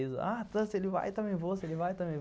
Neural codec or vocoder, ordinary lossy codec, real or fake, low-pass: none; none; real; none